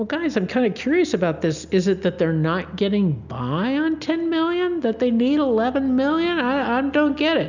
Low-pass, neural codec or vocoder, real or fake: 7.2 kHz; none; real